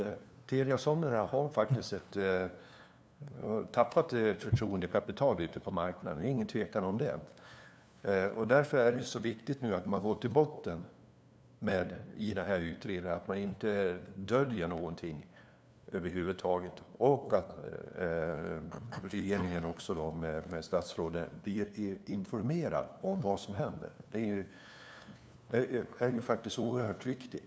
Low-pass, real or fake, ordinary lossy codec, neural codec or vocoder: none; fake; none; codec, 16 kHz, 2 kbps, FunCodec, trained on LibriTTS, 25 frames a second